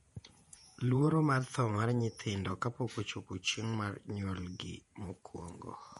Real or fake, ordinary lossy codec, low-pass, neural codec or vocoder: fake; MP3, 48 kbps; 19.8 kHz; vocoder, 48 kHz, 128 mel bands, Vocos